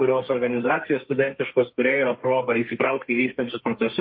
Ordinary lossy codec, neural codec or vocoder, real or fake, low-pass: MP3, 32 kbps; codec, 32 kHz, 1.9 kbps, SNAC; fake; 5.4 kHz